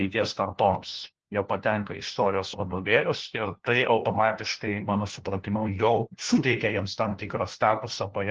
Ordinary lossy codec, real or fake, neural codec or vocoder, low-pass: Opus, 16 kbps; fake; codec, 16 kHz, 1 kbps, FunCodec, trained on LibriTTS, 50 frames a second; 7.2 kHz